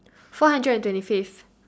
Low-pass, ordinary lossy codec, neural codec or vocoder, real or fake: none; none; none; real